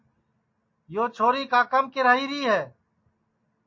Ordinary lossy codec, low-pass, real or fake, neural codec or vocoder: MP3, 32 kbps; 7.2 kHz; real; none